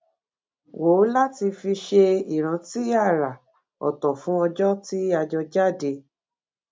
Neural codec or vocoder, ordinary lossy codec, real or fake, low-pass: none; none; real; none